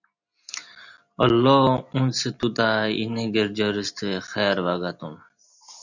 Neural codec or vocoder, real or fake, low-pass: none; real; 7.2 kHz